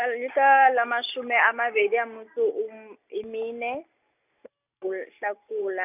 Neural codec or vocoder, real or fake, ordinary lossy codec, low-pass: none; real; none; 3.6 kHz